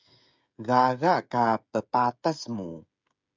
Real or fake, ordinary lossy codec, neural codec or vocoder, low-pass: fake; MP3, 64 kbps; codec, 16 kHz, 16 kbps, FreqCodec, smaller model; 7.2 kHz